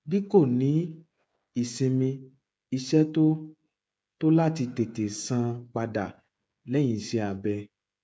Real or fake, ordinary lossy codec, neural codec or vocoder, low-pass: fake; none; codec, 16 kHz, 16 kbps, FreqCodec, smaller model; none